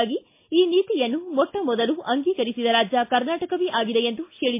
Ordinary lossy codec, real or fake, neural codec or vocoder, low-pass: MP3, 24 kbps; real; none; 3.6 kHz